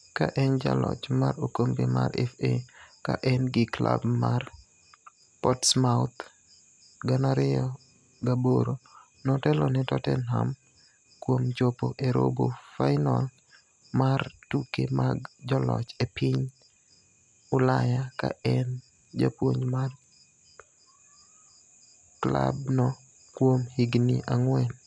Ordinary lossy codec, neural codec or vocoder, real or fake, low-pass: none; none; real; 9.9 kHz